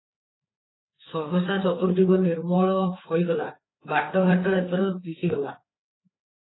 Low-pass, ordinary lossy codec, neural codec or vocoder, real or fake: 7.2 kHz; AAC, 16 kbps; codec, 16 kHz, 4 kbps, FreqCodec, larger model; fake